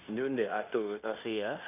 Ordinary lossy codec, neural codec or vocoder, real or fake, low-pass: none; codec, 16 kHz in and 24 kHz out, 0.9 kbps, LongCat-Audio-Codec, fine tuned four codebook decoder; fake; 3.6 kHz